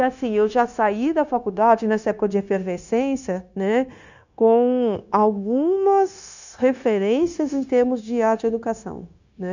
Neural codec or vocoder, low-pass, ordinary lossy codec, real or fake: codec, 16 kHz, 0.9 kbps, LongCat-Audio-Codec; 7.2 kHz; none; fake